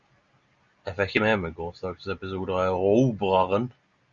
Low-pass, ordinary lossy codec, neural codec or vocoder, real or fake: 7.2 kHz; Opus, 64 kbps; none; real